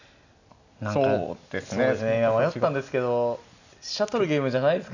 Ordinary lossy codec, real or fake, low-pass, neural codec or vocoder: none; real; 7.2 kHz; none